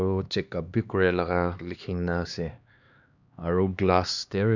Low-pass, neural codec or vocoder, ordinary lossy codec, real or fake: 7.2 kHz; codec, 16 kHz, 2 kbps, X-Codec, HuBERT features, trained on LibriSpeech; none; fake